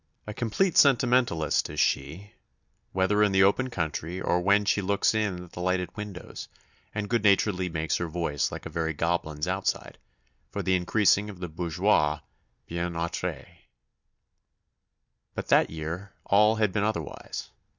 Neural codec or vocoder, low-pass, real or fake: none; 7.2 kHz; real